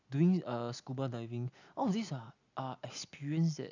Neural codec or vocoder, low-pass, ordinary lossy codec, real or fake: none; 7.2 kHz; none; real